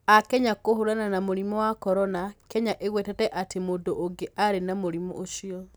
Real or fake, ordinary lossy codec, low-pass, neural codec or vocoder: real; none; none; none